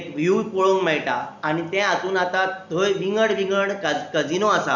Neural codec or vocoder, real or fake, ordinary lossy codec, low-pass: none; real; none; 7.2 kHz